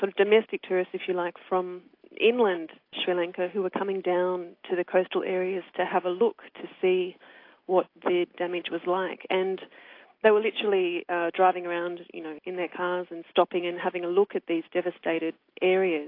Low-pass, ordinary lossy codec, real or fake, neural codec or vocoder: 5.4 kHz; AAC, 32 kbps; real; none